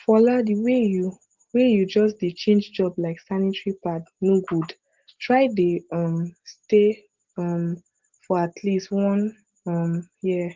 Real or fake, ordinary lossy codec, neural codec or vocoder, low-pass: real; Opus, 16 kbps; none; 7.2 kHz